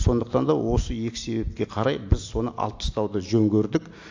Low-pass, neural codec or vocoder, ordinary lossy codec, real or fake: 7.2 kHz; none; AAC, 48 kbps; real